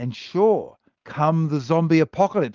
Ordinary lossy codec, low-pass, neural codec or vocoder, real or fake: Opus, 24 kbps; 7.2 kHz; none; real